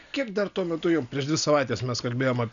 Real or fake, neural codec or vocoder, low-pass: real; none; 7.2 kHz